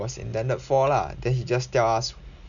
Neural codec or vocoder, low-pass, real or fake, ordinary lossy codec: none; 7.2 kHz; real; none